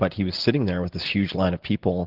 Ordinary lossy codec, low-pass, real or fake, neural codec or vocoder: Opus, 16 kbps; 5.4 kHz; real; none